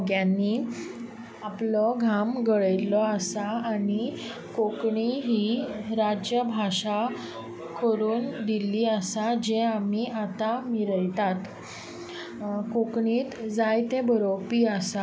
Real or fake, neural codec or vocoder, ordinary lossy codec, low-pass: real; none; none; none